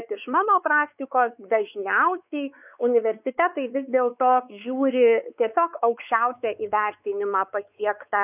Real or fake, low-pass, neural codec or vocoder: fake; 3.6 kHz; codec, 16 kHz, 4 kbps, X-Codec, WavLM features, trained on Multilingual LibriSpeech